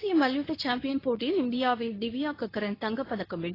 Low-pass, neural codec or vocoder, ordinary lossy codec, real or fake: 5.4 kHz; codec, 24 kHz, 0.9 kbps, WavTokenizer, medium speech release version 2; AAC, 24 kbps; fake